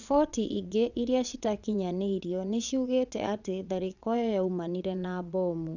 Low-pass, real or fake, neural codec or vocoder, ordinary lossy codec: 7.2 kHz; fake; vocoder, 22.05 kHz, 80 mel bands, WaveNeXt; none